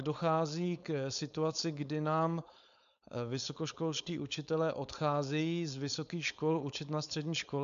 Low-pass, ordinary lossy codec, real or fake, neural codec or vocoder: 7.2 kHz; AAC, 96 kbps; fake; codec, 16 kHz, 4.8 kbps, FACodec